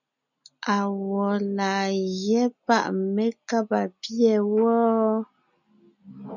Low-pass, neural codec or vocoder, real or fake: 7.2 kHz; none; real